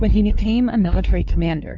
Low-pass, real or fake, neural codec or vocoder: 7.2 kHz; fake; codec, 16 kHz, 4 kbps, X-Codec, WavLM features, trained on Multilingual LibriSpeech